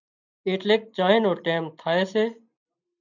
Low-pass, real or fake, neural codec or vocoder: 7.2 kHz; real; none